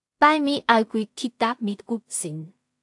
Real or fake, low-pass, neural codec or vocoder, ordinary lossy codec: fake; 10.8 kHz; codec, 16 kHz in and 24 kHz out, 0.4 kbps, LongCat-Audio-Codec, two codebook decoder; AAC, 64 kbps